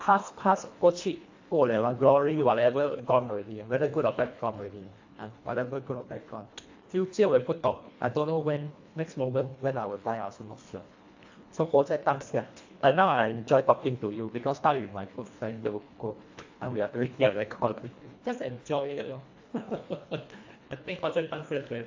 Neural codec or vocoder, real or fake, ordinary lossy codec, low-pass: codec, 24 kHz, 1.5 kbps, HILCodec; fake; AAC, 48 kbps; 7.2 kHz